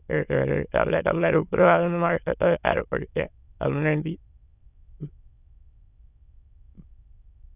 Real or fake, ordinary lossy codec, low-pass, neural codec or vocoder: fake; none; 3.6 kHz; autoencoder, 22.05 kHz, a latent of 192 numbers a frame, VITS, trained on many speakers